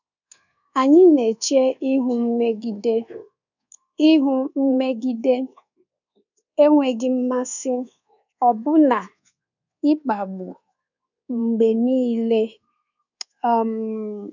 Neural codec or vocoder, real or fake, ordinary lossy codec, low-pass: codec, 24 kHz, 1.2 kbps, DualCodec; fake; none; 7.2 kHz